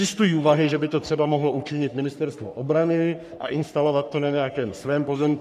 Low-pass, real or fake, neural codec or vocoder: 14.4 kHz; fake; codec, 44.1 kHz, 3.4 kbps, Pupu-Codec